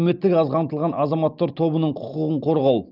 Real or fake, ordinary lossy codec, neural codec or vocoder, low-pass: real; Opus, 32 kbps; none; 5.4 kHz